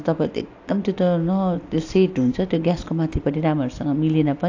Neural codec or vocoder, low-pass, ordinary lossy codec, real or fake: none; 7.2 kHz; AAC, 48 kbps; real